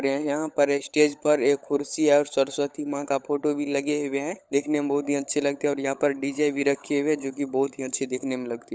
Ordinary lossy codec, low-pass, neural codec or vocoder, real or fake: none; none; codec, 16 kHz, 16 kbps, FunCodec, trained on LibriTTS, 50 frames a second; fake